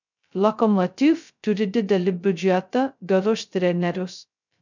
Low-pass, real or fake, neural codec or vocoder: 7.2 kHz; fake; codec, 16 kHz, 0.2 kbps, FocalCodec